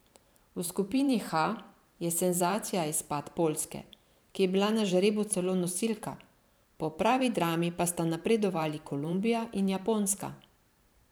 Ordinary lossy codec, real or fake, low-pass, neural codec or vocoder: none; fake; none; vocoder, 44.1 kHz, 128 mel bands every 512 samples, BigVGAN v2